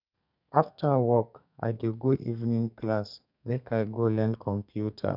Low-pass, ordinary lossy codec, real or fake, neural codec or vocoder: 5.4 kHz; none; fake; codec, 32 kHz, 1.9 kbps, SNAC